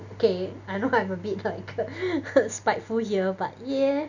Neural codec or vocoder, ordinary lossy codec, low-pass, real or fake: none; none; 7.2 kHz; real